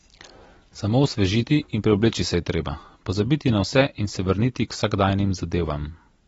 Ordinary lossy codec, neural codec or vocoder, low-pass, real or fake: AAC, 24 kbps; none; 19.8 kHz; real